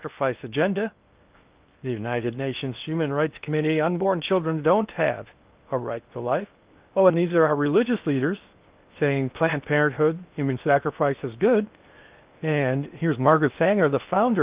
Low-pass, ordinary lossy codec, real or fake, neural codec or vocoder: 3.6 kHz; Opus, 32 kbps; fake; codec, 16 kHz in and 24 kHz out, 0.6 kbps, FocalCodec, streaming, 2048 codes